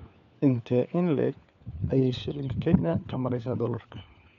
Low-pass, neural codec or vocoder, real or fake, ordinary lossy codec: 7.2 kHz; codec, 16 kHz, 4 kbps, FunCodec, trained on LibriTTS, 50 frames a second; fake; none